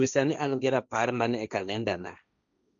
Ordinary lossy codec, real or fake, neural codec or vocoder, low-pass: none; fake; codec, 16 kHz, 1.1 kbps, Voila-Tokenizer; 7.2 kHz